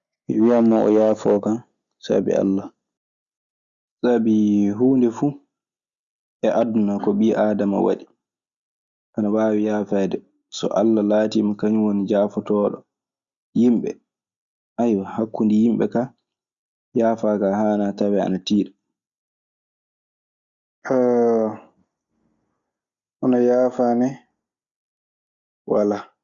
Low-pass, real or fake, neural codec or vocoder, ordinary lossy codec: 7.2 kHz; real; none; Opus, 64 kbps